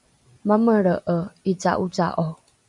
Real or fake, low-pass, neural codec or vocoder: real; 10.8 kHz; none